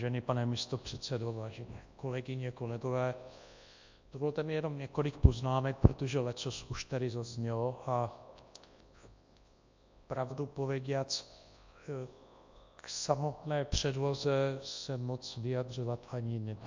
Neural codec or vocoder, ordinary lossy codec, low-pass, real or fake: codec, 24 kHz, 0.9 kbps, WavTokenizer, large speech release; MP3, 48 kbps; 7.2 kHz; fake